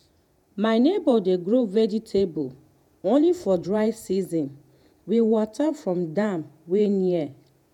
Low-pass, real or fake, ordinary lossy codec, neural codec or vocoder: 19.8 kHz; fake; none; vocoder, 48 kHz, 128 mel bands, Vocos